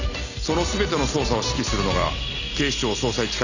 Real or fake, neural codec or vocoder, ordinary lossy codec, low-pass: real; none; none; 7.2 kHz